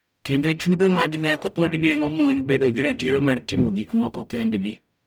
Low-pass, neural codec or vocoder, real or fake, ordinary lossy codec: none; codec, 44.1 kHz, 0.9 kbps, DAC; fake; none